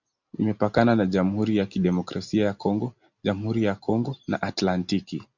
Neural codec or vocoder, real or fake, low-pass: none; real; 7.2 kHz